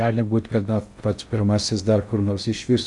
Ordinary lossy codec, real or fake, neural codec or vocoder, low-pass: Opus, 64 kbps; fake; codec, 16 kHz in and 24 kHz out, 0.6 kbps, FocalCodec, streaming, 4096 codes; 10.8 kHz